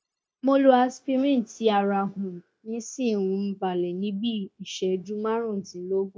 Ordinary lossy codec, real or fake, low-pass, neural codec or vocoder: none; fake; none; codec, 16 kHz, 0.9 kbps, LongCat-Audio-Codec